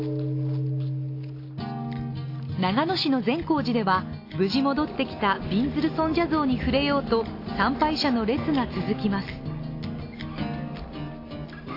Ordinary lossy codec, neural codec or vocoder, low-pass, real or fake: AAC, 32 kbps; none; 5.4 kHz; real